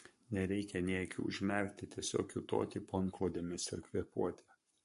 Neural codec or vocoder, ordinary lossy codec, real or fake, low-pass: codec, 44.1 kHz, 7.8 kbps, Pupu-Codec; MP3, 48 kbps; fake; 14.4 kHz